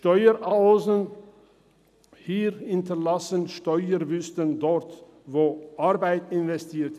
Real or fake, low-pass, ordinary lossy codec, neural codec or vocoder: real; 14.4 kHz; none; none